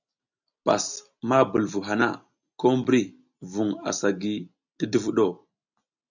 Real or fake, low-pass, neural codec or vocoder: real; 7.2 kHz; none